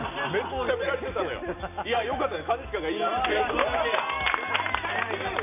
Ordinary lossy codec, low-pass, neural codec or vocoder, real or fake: none; 3.6 kHz; none; real